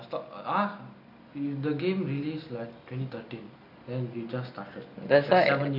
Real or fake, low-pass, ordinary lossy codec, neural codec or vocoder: real; 5.4 kHz; none; none